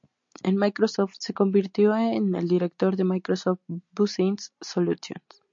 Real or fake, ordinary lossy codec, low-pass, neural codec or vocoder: real; MP3, 48 kbps; 7.2 kHz; none